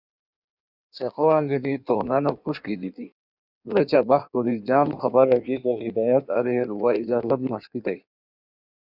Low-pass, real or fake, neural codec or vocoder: 5.4 kHz; fake; codec, 16 kHz in and 24 kHz out, 1.1 kbps, FireRedTTS-2 codec